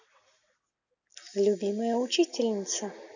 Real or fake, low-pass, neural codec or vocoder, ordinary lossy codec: real; 7.2 kHz; none; none